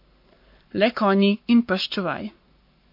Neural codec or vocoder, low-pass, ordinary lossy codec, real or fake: codec, 44.1 kHz, 7.8 kbps, Pupu-Codec; 5.4 kHz; MP3, 32 kbps; fake